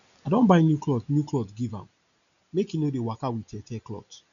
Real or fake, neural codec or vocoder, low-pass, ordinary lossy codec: real; none; 7.2 kHz; none